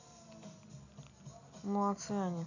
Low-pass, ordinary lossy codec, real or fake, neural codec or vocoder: 7.2 kHz; none; real; none